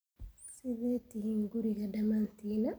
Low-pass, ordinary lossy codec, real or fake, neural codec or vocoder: none; none; real; none